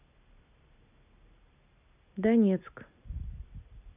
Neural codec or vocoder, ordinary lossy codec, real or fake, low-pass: none; none; real; 3.6 kHz